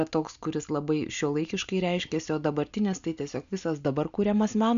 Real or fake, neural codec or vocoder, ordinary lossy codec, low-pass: real; none; AAC, 96 kbps; 7.2 kHz